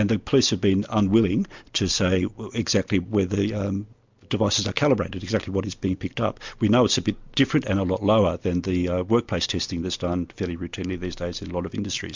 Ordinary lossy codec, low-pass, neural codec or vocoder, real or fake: MP3, 64 kbps; 7.2 kHz; none; real